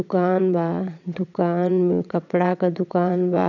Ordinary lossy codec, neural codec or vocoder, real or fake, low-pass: none; vocoder, 22.05 kHz, 80 mel bands, WaveNeXt; fake; 7.2 kHz